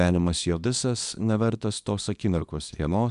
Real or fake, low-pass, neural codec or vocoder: fake; 10.8 kHz; codec, 24 kHz, 0.9 kbps, WavTokenizer, small release